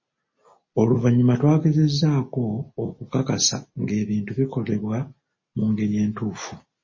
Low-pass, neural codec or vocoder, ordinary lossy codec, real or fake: 7.2 kHz; none; MP3, 32 kbps; real